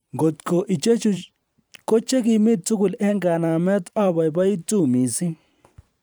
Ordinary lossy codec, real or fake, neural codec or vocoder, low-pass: none; fake; vocoder, 44.1 kHz, 128 mel bands every 512 samples, BigVGAN v2; none